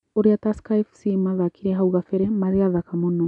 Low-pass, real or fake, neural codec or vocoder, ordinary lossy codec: 10.8 kHz; real; none; none